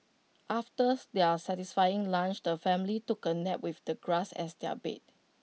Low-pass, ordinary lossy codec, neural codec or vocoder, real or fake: none; none; none; real